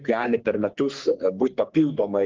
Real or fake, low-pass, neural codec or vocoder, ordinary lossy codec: fake; 7.2 kHz; codec, 44.1 kHz, 3.4 kbps, Pupu-Codec; Opus, 16 kbps